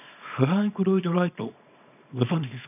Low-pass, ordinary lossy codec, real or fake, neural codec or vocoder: 3.6 kHz; none; fake; codec, 24 kHz, 0.9 kbps, WavTokenizer, small release